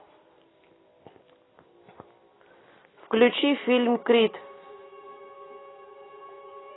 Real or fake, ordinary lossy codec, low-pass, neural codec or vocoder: real; AAC, 16 kbps; 7.2 kHz; none